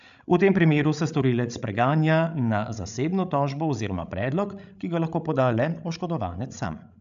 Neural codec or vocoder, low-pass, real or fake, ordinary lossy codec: codec, 16 kHz, 16 kbps, FreqCodec, larger model; 7.2 kHz; fake; AAC, 96 kbps